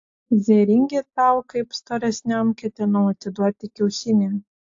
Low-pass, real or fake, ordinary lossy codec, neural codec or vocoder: 7.2 kHz; real; AAC, 48 kbps; none